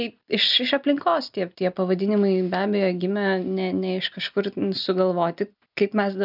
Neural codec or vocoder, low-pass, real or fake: none; 5.4 kHz; real